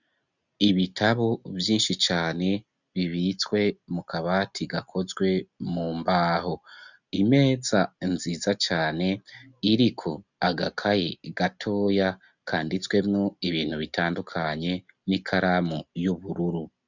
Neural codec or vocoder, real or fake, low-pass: none; real; 7.2 kHz